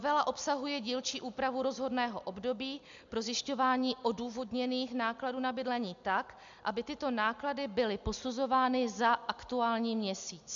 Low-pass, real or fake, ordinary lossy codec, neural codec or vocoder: 7.2 kHz; real; MP3, 64 kbps; none